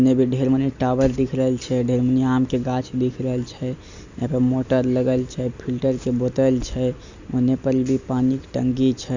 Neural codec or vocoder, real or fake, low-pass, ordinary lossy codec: none; real; 7.2 kHz; Opus, 64 kbps